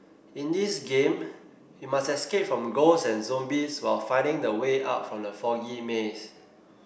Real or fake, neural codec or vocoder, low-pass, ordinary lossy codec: real; none; none; none